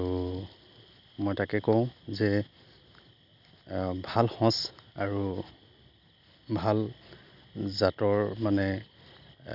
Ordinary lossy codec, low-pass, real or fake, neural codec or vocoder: none; 5.4 kHz; real; none